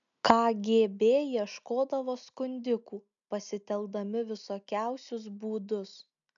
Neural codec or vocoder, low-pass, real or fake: none; 7.2 kHz; real